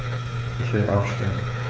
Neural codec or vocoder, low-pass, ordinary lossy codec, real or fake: codec, 16 kHz, 8 kbps, FreqCodec, smaller model; none; none; fake